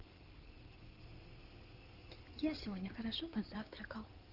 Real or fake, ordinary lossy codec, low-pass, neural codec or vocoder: fake; Opus, 32 kbps; 5.4 kHz; codec, 16 kHz in and 24 kHz out, 2.2 kbps, FireRedTTS-2 codec